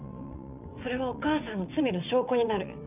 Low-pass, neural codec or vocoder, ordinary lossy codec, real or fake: 3.6 kHz; vocoder, 22.05 kHz, 80 mel bands, WaveNeXt; none; fake